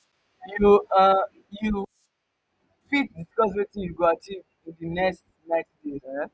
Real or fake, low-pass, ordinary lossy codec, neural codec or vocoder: real; none; none; none